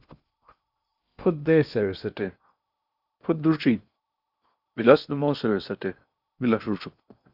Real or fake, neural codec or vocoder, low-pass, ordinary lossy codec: fake; codec, 16 kHz in and 24 kHz out, 0.8 kbps, FocalCodec, streaming, 65536 codes; 5.4 kHz; Opus, 64 kbps